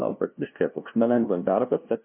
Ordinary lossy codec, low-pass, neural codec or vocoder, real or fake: MP3, 32 kbps; 3.6 kHz; codec, 16 kHz, 0.5 kbps, FunCodec, trained on LibriTTS, 25 frames a second; fake